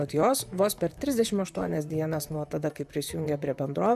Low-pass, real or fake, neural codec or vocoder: 14.4 kHz; fake; vocoder, 44.1 kHz, 128 mel bands, Pupu-Vocoder